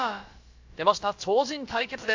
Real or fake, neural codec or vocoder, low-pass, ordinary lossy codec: fake; codec, 16 kHz, about 1 kbps, DyCAST, with the encoder's durations; 7.2 kHz; AAC, 48 kbps